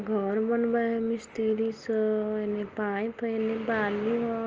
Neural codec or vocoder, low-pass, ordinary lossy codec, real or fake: none; 7.2 kHz; Opus, 24 kbps; real